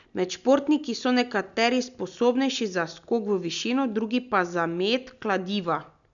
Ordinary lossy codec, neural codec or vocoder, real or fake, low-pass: none; none; real; 7.2 kHz